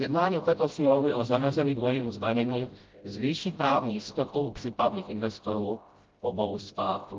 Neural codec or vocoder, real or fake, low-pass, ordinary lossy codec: codec, 16 kHz, 0.5 kbps, FreqCodec, smaller model; fake; 7.2 kHz; Opus, 32 kbps